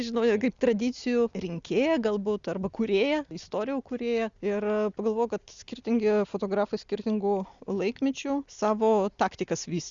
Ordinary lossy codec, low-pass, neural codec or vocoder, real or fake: Opus, 64 kbps; 7.2 kHz; none; real